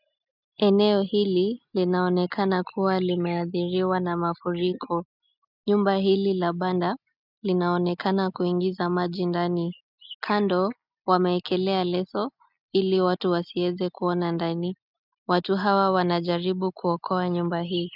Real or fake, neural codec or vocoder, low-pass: real; none; 5.4 kHz